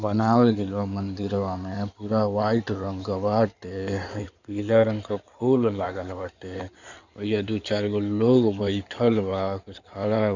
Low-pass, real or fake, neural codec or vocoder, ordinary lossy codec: 7.2 kHz; fake; codec, 16 kHz in and 24 kHz out, 2.2 kbps, FireRedTTS-2 codec; none